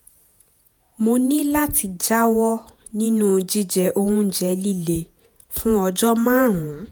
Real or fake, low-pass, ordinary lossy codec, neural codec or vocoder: fake; none; none; vocoder, 48 kHz, 128 mel bands, Vocos